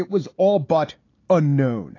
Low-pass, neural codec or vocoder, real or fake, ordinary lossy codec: 7.2 kHz; none; real; AAC, 32 kbps